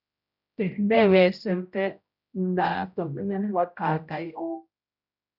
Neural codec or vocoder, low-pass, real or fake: codec, 16 kHz, 0.5 kbps, X-Codec, HuBERT features, trained on general audio; 5.4 kHz; fake